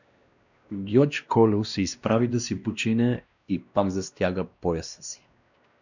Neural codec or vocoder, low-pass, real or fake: codec, 16 kHz, 1 kbps, X-Codec, WavLM features, trained on Multilingual LibriSpeech; 7.2 kHz; fake